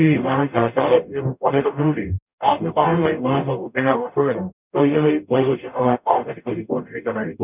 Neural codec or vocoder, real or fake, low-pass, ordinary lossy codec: codec, 44.1 kHz, 0.9 kbps, DAC; fake; 3.6 kHz; none